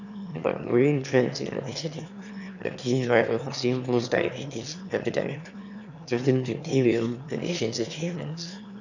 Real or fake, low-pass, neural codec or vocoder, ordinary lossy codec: fake; 7.2 kHz; autoencoder, 22.05 kHz, a latent of 192 numbers a frame, VITS, trained on one speaker; none